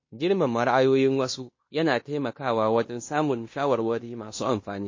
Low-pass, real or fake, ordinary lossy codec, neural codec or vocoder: 7.2 kHz; fake; MP3, 32 kbps; codec, 16 kHz in and 24 kHz out, 0.9 kbps, LongCat-Audio-Codec, fine tuned four codebook decoder